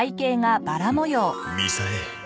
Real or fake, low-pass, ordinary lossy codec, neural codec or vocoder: real; none; none; none